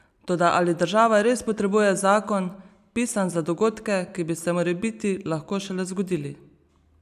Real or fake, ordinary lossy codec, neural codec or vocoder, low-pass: real; none; none; 14.4 kHz